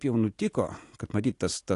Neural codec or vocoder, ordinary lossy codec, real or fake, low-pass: none; AAC, 48 kbps; real; 10.8 kHz